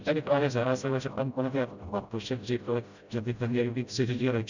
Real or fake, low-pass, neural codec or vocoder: fake; 7.2 kHz; codec, 16 kHz, 0.5 kbps, FreqCodec, smaller model